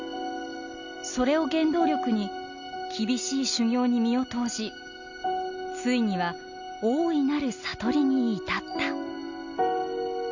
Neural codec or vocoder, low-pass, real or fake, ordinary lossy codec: none; 7.2 kHz; real; none